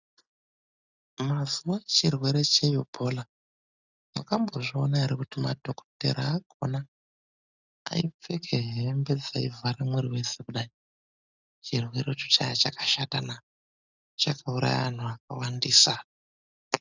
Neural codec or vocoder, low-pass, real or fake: none; 7.2 kHz; real